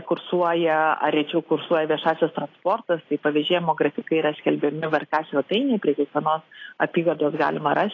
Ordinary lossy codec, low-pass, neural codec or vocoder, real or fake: AAC, 32 kbps; 7.2 kHz; none; real